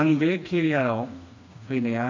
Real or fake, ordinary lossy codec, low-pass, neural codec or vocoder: fake; MP3, 64 kbps; 7.2 kHz; codec, 16 kHz, 2 kbps, FreqCodec, smaller model